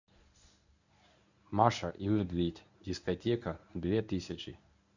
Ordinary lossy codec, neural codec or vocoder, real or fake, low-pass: none; codec, 24 kHz, 0.9 kbps, WavTokenizer, medium speech release version 1; fake; 7.2 kHz